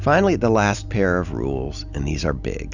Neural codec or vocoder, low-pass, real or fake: none; 7.2 kHz; real